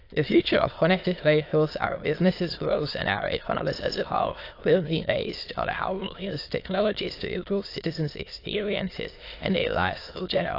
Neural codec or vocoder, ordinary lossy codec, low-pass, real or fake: autoencoder, 22.05 kHz, a latent of 192 numbers a frame, VITS, trained on many speakers; AAC, 32 kbps; 5.4 kHz; fake